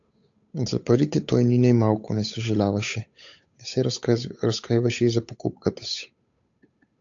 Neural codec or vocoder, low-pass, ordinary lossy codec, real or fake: codec, 16 kHz, 8 kbps, FunCodec, trained on Chinese and English, 25 frames a second; 7.2 kHz; AAC, 64 kbps; fake